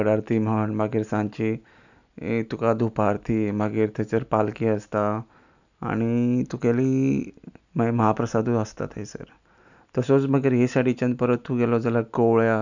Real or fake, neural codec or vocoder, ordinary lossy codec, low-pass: real; none; none; 7.2 kHz